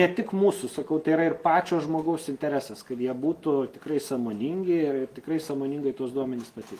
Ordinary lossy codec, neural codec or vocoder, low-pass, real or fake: Opus, 24 kbps; none; 14.4 kHz; real